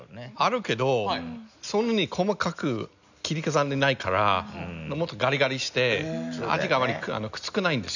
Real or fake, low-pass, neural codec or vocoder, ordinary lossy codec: real; 7.2 kHz; none; none